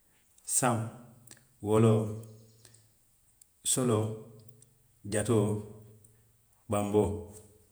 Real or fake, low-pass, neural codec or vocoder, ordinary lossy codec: fake; none; vocoder, 48 kHz, 128 mel bands, Vocos; none